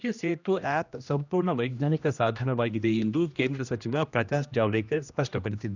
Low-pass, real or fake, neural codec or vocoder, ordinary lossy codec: 7.2 kHz; fake; codec, 16 kHz, 1 kbps, X-Codec, HuBERT features, trained on general audio; none